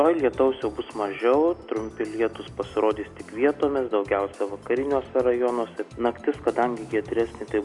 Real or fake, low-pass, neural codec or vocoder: real; 10.8 kHz; none